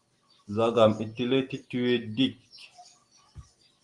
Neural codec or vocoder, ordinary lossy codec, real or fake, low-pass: none; Opus, 24 kbps; real; 10.8 kHz